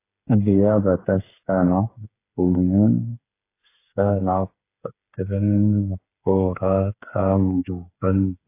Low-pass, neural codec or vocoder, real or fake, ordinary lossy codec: 3.6 kHz; codec, 16 kHz, 8 kbps, FreqCodec, smaller model; fake; AAC, 24 kbps